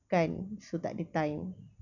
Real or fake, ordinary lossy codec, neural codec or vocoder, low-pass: real; none; none; 7.2 kHz